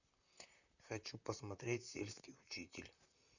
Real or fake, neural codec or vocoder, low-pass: fake; vocoder, 44.1 kHz, 128 mel bands, Pupu-Vocoder; 7.2 kHz